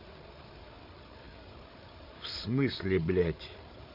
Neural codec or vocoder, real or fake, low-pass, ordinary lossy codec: codec, 16 kHz, 8 kbps, FreqCodec, larger model; fake; 5.4 kHz; none